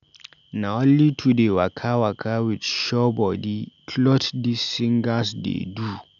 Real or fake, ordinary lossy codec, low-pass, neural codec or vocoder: real; none; 7.2 kHz; none